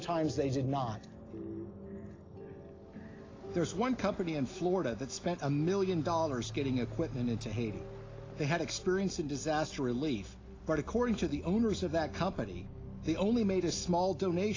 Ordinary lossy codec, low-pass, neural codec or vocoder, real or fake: AAC, 32 kbps; 7.2 kHz; none; real